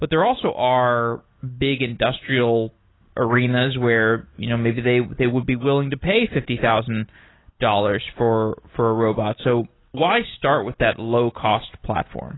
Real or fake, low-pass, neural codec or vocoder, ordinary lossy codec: real; 7.2 kHz; none; AAC, 16 kbps